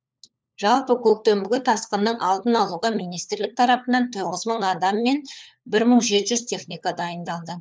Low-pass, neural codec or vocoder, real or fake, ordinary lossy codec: none; codec, 16 kHz, 4 kbps, FunCodec, trained on LibriTTS, 50 frames a second; fake; none